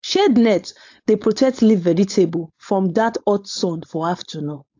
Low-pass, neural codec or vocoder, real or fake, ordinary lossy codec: 7.2 kHz; codec, 16 kHz, 4.8 kbps, FACodec; fake; AAC, 48 kbps